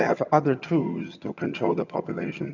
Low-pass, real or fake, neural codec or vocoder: 7.2 kHz; fake; vocoder, 22.05 kHz, 80 mel bands, HiFi-GAN